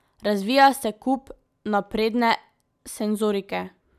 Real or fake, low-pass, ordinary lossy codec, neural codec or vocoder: real; 14.4 kHz; none; none